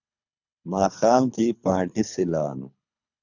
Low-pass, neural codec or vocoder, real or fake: 7.2 kHz; codec, 24 kHz, 3 kbps, HILCodec; fake